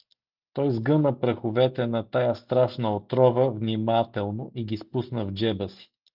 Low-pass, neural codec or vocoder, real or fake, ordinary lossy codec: 5.4 kHz; none; real; Opus, 32 kbps